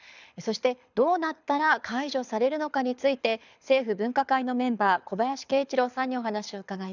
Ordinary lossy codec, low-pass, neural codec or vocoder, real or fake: none; 7.2 kHz; codec, 24 kHz, 6 kbps, HILCodec; fake